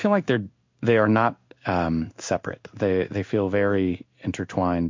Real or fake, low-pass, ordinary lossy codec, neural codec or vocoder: fake; 7.2 kHz; MP3, 48 kbps; codec, 16 kHz in and 24 kHz out, 1 kbps, XY-Tokenizer